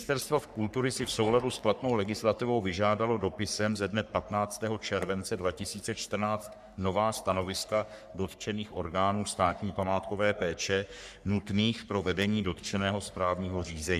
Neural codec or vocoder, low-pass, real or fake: codec, 44.1 kHz, 3.4 kbps, Pupu-Codec; 14.4 kHz; fake